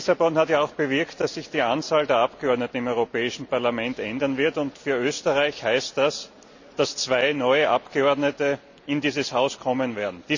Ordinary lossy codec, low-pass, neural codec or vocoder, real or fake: none; 7.2 kHz; none; real